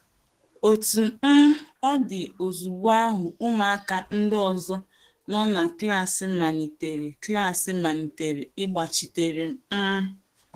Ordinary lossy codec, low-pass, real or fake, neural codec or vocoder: Opus, 16 kbps; 14.4 kHz; fake; codec, 32 kHz, 1.9 kbps, SNAC